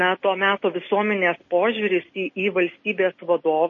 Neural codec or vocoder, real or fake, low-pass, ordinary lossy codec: none; real; 7.2 kHz; MP3, 32 kbps